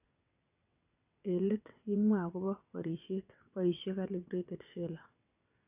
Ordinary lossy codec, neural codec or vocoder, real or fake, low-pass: AAC, 32 kbps; vocoder, 22.05 kHz, 80 mel bands, WaveNeXt; fake; 3.6 kHz